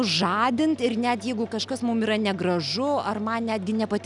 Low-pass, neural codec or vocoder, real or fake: 10.8 kHz; none; real